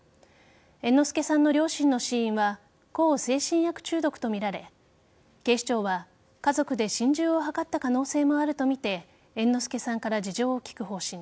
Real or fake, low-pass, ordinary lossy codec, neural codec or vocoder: real; none; none; none